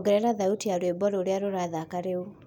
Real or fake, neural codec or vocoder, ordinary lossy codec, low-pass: real; none; none; 19.8 kHz